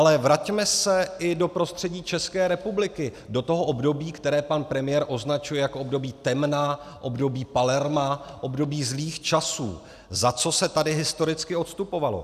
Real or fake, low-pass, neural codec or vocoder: real; 14.4 kHz; none